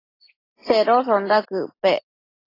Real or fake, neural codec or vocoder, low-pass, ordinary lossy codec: real; none; 5.4 kHz; AAC, 24 kbps